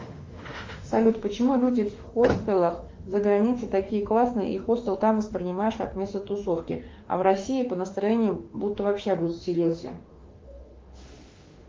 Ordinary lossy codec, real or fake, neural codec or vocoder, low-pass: Opus, 32 kbps; fake; autoencoder, 48 kHz, 32 numbers a frame, DAC-VAE, trained on Japanese speech; 7.2 kHz